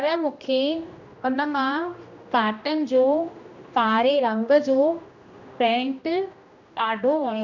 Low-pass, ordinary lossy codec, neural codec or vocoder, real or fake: 7.2 kHz; none; codec, 16 kHz, 1 kbps, X-Codec, HuBERT features, trained on general audio; fake